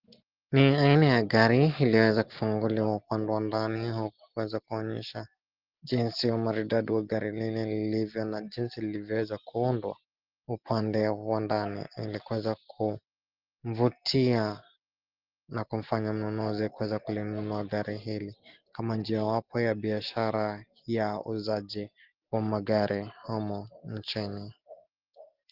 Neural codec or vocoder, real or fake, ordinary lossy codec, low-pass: none; real; Opus, 16 kbps; 5.4 kHz